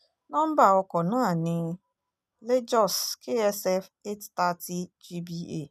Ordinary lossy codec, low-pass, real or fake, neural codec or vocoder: none; 14.4 kHz; real; none